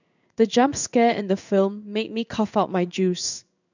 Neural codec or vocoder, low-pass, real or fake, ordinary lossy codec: codec, 16 kHz in and 24 kHz out, 1 kbps, XY-Tokenizer; 7.2 kHz; fake; none